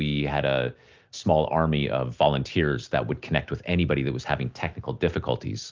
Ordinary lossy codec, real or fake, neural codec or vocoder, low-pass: Opus, 32 kbps; real; none; 7.2 kHz